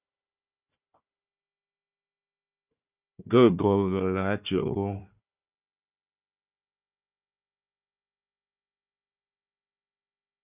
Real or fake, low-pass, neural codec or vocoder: fake; 3.6 kHz; codec, 16 kHz, 1 kbps, FunCodec, trained on Chinese and English, 50 frames a second